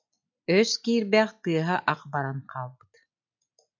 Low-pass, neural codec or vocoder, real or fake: 7.2 kHz; none; real